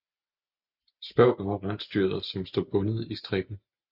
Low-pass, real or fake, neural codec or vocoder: 5.4 kHz; real; none